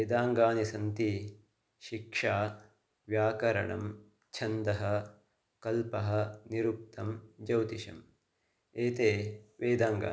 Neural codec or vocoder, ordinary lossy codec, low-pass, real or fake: none; none; none; real